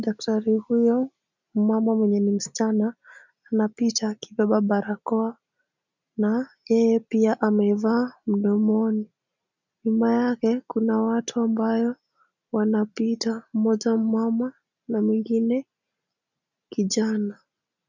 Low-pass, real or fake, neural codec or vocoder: 7.2 kHz; real; none